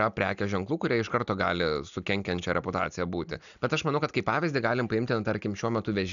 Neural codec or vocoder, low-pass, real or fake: none; 7.2 kHz; real